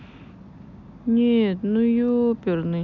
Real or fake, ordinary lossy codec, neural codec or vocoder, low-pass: real; none; none; 7.2 kHz